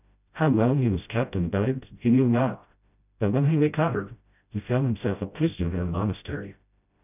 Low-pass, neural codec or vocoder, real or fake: 3.6 kHz; codec, 16 kHz, 0.5 kbps, FreqCodec, smaller model; fake